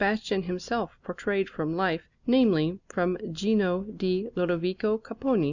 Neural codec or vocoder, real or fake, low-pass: none; real; 7.2 kHz